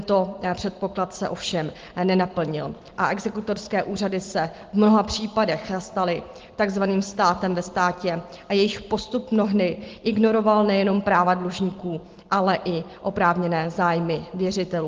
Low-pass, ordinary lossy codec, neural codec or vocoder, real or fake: 7.2 kHz; Opus, 16 kbps; none; real